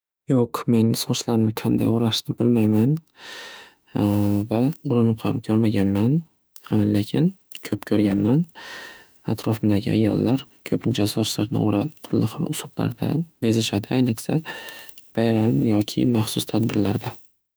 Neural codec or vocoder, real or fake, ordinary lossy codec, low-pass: autoencoder, 48 kHz, 32 numbers a frame, DAC-VAE, trained on Japanese speech; fake; none; none